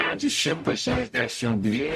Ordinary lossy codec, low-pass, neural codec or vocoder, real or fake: MP3, 64 kbps; 14.4 kHz; codec, 44.1 kHz, 0.9 kbps, DAC; fake